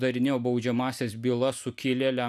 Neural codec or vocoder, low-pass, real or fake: none; 14.4 kHz; real